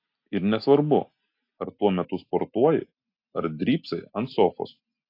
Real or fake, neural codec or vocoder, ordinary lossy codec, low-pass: real; none; AAC, 32 kbps; 5.4 kHz